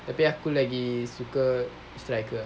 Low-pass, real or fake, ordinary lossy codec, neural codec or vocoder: none; real; none; none